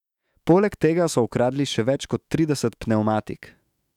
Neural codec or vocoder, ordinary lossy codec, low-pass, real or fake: autoencoder, 48 kHz, 128 numbers a frame, DAC-VAE, trained on Japanese speech; none; 19.8 kHz; fake